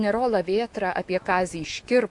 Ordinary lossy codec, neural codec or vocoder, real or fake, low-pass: AAC, 64 kbps; autoencoder, 48 kHz, 128 numbers a frame, DAC-VAE, trained on Japanese speech; fake; 10.8 kHz